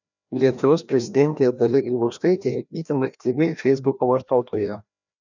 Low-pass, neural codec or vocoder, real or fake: 7.2 kHz; codec, 16 kHz, 1 kbps, FreqCodec, larger model; fake